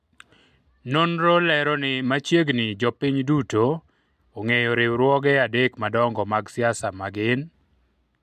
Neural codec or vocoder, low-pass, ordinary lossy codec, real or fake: none; 14.4 kHz; MP3, 96 kbps; real